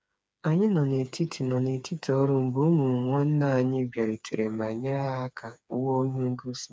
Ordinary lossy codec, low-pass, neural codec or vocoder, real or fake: none; none; codec, 16 kHz, 4 kbps, FreqCodec, smaller model; fake